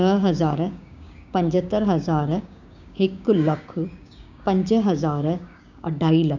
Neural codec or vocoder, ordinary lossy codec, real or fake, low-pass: codec, 16 kHz, 6 kbps, DAC; none; fake; 7.2 kHz